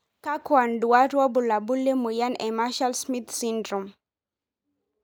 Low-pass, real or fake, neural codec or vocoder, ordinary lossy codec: none; real; none; none